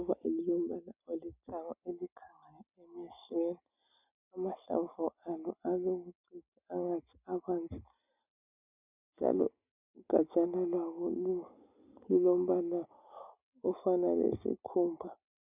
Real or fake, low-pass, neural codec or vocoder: real; 3.6 kHz; none